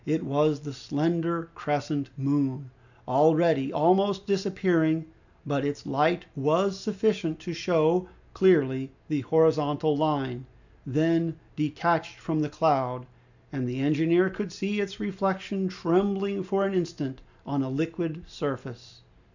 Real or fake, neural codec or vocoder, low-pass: real; none; 7.2 kHz